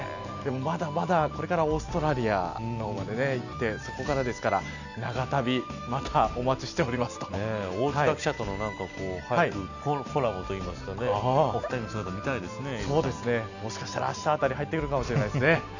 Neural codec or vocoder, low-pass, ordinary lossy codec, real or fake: none; 7.2 kHz; none; real